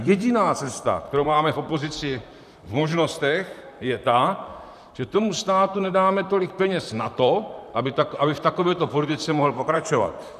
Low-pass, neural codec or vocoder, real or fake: 14.4 kHz; vocoder, 44.1 kHz, 128 mel bands, Pupu-Vocoder; fake